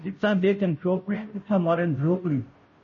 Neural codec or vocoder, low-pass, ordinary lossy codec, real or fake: codec, 16 kHz, 0.5 kbps, FunCodec, trained on Chinese and English, 25 frames a second; 7.2 kHz; MP3, 32 kbps; fake